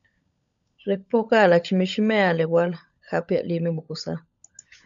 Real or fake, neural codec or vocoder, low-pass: fake; codec, 16 kHz, 16 kbps, FunCodec, trained on LibriTTS, 50 frames a second; 7.2 kHz